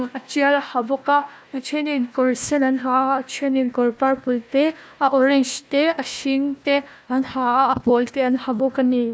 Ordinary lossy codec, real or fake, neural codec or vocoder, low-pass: none; fake; codec, 16 kHz, 1 kbps, FunCodec, trained on Chinese and English, 50 frames a second; none